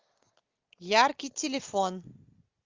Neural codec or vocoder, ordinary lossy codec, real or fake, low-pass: none; Opus, 24 kbps; real; 7.2 kHz